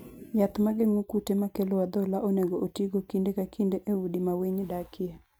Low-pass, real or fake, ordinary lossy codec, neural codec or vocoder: none; real; none; none